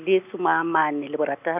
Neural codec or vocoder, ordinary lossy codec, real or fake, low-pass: none; none; real; 3.6 kHz